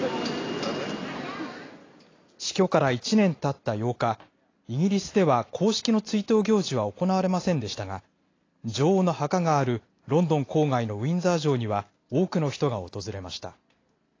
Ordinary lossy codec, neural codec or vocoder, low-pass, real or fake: AAC, 32 kbps; none; 7.2 kHz; real